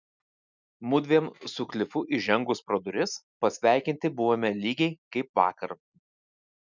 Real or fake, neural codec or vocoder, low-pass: real; none; 7.2 kHz